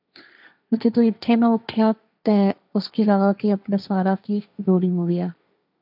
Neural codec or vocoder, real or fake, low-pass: codec, 16 kHz, 1.1 kbps, Voila-Tokenizer; fake; 5.4 kHz